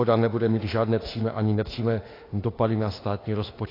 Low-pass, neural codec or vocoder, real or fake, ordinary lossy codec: 5.4 kHz; autoencoder, 48 kHz, 32 numbers a frame, DAC-VAE, trained on Japanese speech; fake; AAC, 24 kbps